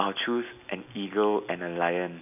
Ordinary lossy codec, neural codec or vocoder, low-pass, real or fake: none; none; 3.6 kHz; real